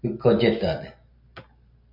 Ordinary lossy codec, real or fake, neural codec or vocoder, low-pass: MP3, 48 kbps; real; none; 5.4 kHz